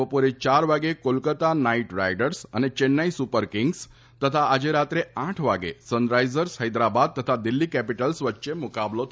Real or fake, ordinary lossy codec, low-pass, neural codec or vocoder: real; none; none; none